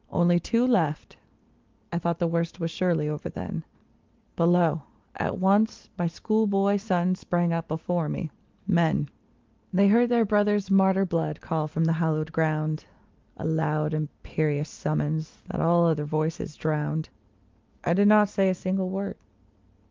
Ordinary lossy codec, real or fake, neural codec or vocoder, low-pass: Opus, 32 kbps; real; none; 7.2 kHz